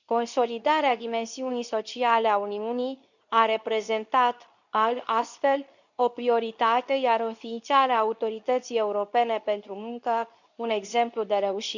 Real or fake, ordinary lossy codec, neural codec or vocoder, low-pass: fake; none; codec, 24 kHz, 0.9 kbps, WavTokenizer, medium speech release version 2; 7.2 kHz